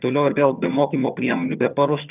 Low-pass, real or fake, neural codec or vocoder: 3.6 kHz; fake; vocoder, 22.05 kHz, 80 mel bands, HiFi-GAN